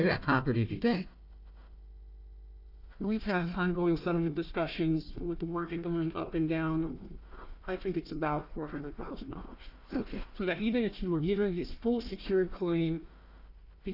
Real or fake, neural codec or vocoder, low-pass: fake; codec, 16 kHz, 1 kbps, FunCodec, trained on Chinese and English, 50 frames a second; 5.4 kHz